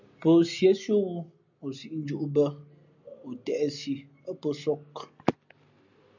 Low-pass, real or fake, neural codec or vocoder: 7.2 kHz; real; none